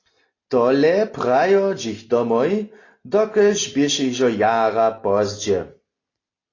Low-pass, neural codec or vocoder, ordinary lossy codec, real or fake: 7.2 kHz; none; AAC, 32 kbps; real